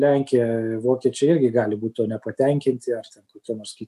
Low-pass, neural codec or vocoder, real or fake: 14.4 kHz; none; real